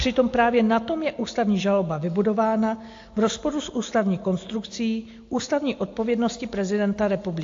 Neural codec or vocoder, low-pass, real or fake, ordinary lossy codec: none; 7.2 kHz; real; AAC, 48 kbps